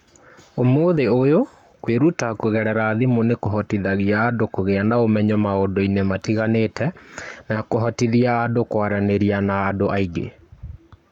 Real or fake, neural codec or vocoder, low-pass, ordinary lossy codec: fake; codec, 44.1 kHz, 7.8 kbps, Pupu-Codec; 19.8 kHz; MP3, 96 kbps